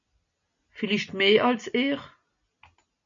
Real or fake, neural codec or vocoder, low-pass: real; none; 7.2 kHz